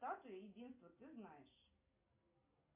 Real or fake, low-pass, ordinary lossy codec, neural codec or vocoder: real; 3.6 kHz; MP3, 24 kbps; none